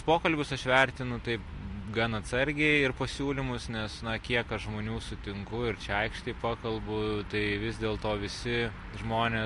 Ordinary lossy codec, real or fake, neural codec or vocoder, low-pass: MP3, 48 kbps; real; none; 14.4 kHz